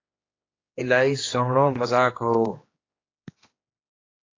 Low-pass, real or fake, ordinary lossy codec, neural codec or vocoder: 7.2 kHz; fake; AAC, 32 kbps; codec, 16 kHz, 2 kbps, X-Codec, HuBERT features, trained on general audio